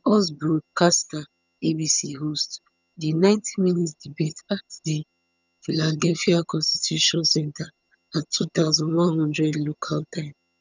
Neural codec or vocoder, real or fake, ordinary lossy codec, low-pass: vocoder, 22.05 kHz, 80 mel bands, HiFi-GAN; fake; none; 7.2 kHz